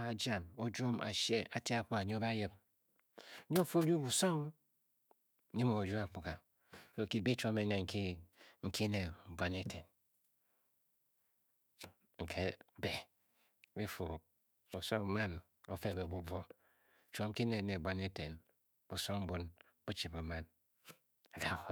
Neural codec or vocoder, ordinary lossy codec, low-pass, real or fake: autoencoder, 48 kHz, 128 numbers a frame, DAC-VAE, trained on Japanese speech; none; none; fake